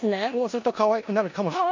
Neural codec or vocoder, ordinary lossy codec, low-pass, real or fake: codec, 16 kHz in and 24 kHz out, 0.9 kbps, LongCat-Audio-Codec, four codebook decoder; MP3, 48 kbps; 7.2 kHz; fake